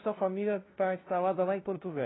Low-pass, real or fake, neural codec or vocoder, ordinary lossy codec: 7.2 kHz; fake; codec, 16 kHz, 0.5 kbps, FunCodec, trained on LibriTTS, 25 frames a second; AAC, 16 kbps